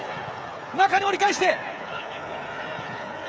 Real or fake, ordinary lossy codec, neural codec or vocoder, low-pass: fake; none; codec, 16 kHz, 8 kbps, FreqCodec, smaller model; none